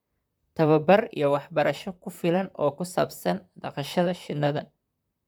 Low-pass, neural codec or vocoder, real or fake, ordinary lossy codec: none; vocoder, 44.1 kHz, 128 mel bands, Pupu-Vocoder; fake; none